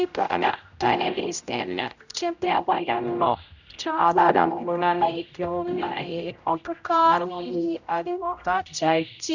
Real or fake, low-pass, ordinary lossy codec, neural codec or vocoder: fake; 7.2 kHz; none; codec, 16 kHz, 0.5 kbps, X-Codec, HuBERT features, trained on general audio